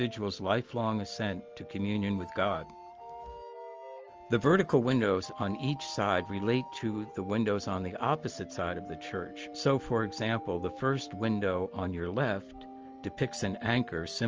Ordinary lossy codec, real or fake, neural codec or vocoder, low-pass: Opus, 24 kbps; real; none; 7.2 kHz